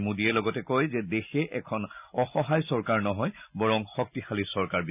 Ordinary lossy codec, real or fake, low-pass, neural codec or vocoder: none; real; 3.6 kHz; none